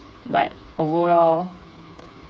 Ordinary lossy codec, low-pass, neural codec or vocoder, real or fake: none; none; codec, 16 kHz, 4 kbps, FreqCodec, smaller model; fake